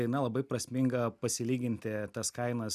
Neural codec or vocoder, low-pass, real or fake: none; 14.4 kHz; real